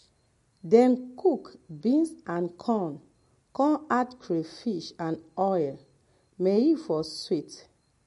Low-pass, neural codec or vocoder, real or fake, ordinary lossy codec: 14.4 kHz; none; real; MP3, 48 kbps